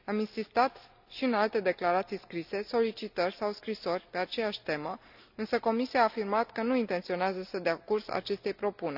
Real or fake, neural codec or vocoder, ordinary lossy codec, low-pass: real; none; none; 5.4 kHz